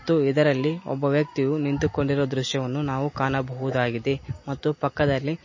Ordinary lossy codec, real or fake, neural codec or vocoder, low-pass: MP3, 32 kbps; real; none; 7.2 kHz